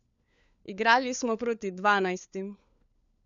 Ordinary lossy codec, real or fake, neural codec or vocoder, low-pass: none; fake; codec, 16 kHz, 4 kbps, FunCodec, trained on LibriTTS, 50 frames a second; 7.2 kHz